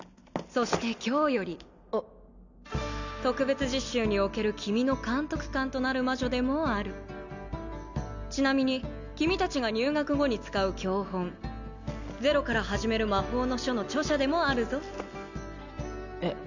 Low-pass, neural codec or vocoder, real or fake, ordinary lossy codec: 7.2 kHz; none; real; none